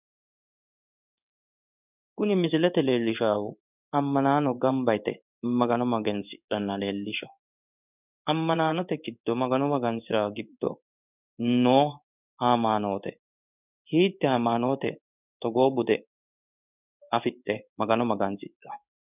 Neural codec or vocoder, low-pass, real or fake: codec, 16 kHz in and 24 kHz out, 1 kbps, XY-Tokenizer; 3.6 kHz; fake